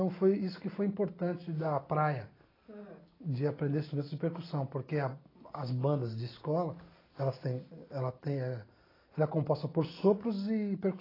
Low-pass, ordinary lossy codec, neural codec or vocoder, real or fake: 5.4 kHz; AAC, 24 kbps; none; real